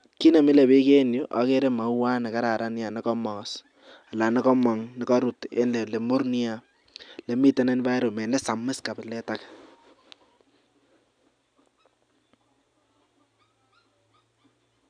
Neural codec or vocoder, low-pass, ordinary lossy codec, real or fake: none; 9.9 kHz; none; real